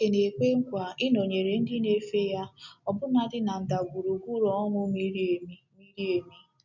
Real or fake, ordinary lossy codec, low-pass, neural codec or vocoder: real; none; 7.2 kHz; none